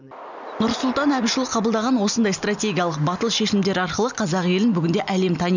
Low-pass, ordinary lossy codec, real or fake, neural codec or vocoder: 7.2 kHz; none; real; none